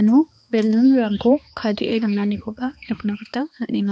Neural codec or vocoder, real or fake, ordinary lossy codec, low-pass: codec, 16 kHz, 2 kbps, X-Codec, HuBERT features, trained on balanced general audio; fake; none; none